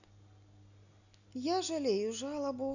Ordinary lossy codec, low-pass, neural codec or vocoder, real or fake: none; 7.2 kHz; none; real